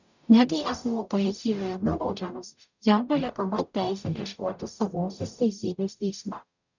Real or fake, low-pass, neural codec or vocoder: fake; 7.2 kHz; codec, 44.1 kHz, 0.9 kbps, DAC